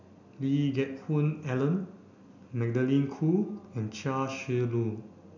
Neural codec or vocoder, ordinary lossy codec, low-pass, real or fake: none; none; 7.2 kHz; real